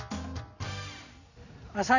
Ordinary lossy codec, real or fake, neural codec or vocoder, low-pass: none; real; none; 7.2 kHz